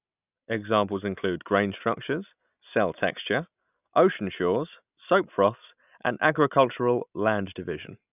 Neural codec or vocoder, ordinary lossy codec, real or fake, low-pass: none; none; real; 3.6 kHz